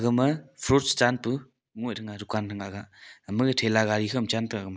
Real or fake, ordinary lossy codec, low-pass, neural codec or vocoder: real; none; none; none